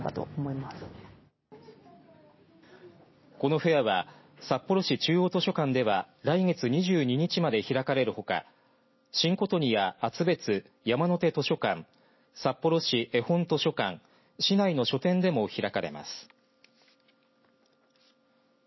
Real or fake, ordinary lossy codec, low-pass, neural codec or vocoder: real; MP3, 24 kbps; 7.2 kHz; none